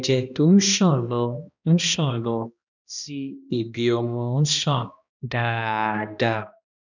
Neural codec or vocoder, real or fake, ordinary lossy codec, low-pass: codec, 16 kHz, 1 kbps, X-Codec, HuBERT features, trained on balanced general audio; fake; none; 7.2 kHz